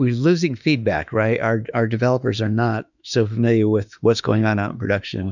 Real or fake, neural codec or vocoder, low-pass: fake; autoencoder, 48 kHz, 32 numbers a frame, DAC-VAE, trained on Japanese speech; 7.2 kHz